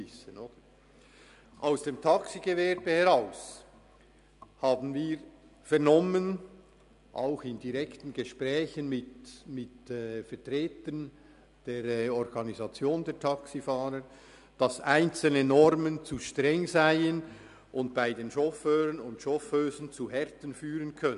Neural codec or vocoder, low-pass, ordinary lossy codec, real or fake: none; 10.8 kHz; none; real